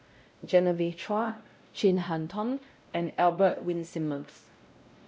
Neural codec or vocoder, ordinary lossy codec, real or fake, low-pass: codec, 16 kHz, 0.5 kbps, X-Codec, WavLM features, trained on Multilingual LibriSpeech; none; fake; none